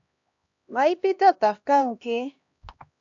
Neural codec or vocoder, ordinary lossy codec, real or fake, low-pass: codec, 16 kHz, 1 kbps, X-Codec, HuBERT features, trained on LibriSpeech; AAC, 64 kbps; fake; 7.2 kHz